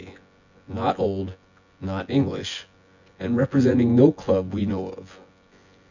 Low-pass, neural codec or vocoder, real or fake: 7.2 kHz; vocoder, 24 kHz, 100 mel bands, Vocos; fake